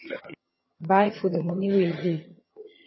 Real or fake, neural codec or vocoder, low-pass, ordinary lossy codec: fake; vocoder, 22.05 kHz, 80 mel bands, HiFi-GAN; 7.2 kHz; MP3, 24 kbps